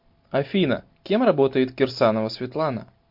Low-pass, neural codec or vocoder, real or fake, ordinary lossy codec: 5.4 kHz; none; real; MP3, 48 kbps